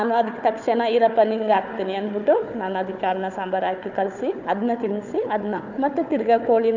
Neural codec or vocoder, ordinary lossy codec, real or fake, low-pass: codec, 16 kHz, 4 kbps, FunCodec, trained on Chinese and English, 50 frames a second; none; fake; 7.2 kHz